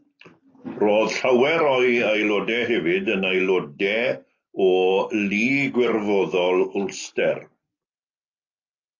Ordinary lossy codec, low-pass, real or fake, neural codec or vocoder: AAC, 32 kbps; 7.2 kHz; real; none